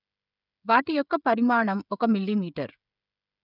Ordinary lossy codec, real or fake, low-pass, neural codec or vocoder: none; fake; 5.4 kHz; codec, 16 kHz, 16 kbps, FreqCodec, smaller model